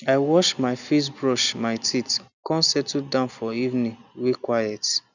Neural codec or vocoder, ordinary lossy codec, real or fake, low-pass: none; none; real; 7.2 kHz